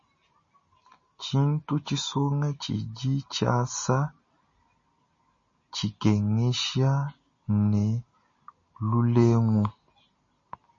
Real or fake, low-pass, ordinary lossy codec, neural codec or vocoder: real; 7.2 kHz; MP3, 32 kbps; none